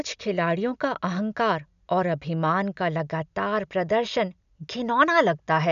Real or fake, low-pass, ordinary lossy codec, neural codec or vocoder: real; 7.2 kHz; none; none